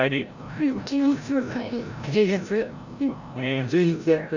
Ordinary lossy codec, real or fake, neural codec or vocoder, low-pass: none; fake; codec, 16 kHz, 0.5 kbps, FreqCodec, larger model; 7.2 kHz